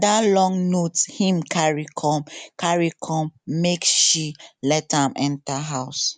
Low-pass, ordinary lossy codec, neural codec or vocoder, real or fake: 10.8 kHz; none; none; real